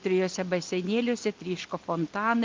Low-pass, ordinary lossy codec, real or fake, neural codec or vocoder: 7.2 kHz; Opus, 32 kbps; real; none